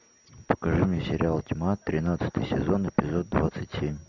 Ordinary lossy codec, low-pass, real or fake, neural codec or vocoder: MP3, 64 kbps; 7.2 kHz; real; none